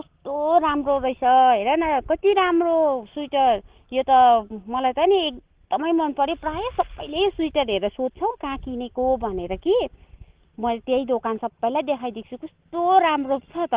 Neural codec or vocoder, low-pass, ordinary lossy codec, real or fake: none; 3.6 kHz; Opus, 16 kbps; real